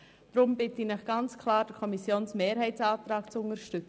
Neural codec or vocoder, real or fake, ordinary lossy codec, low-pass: none; real; none; none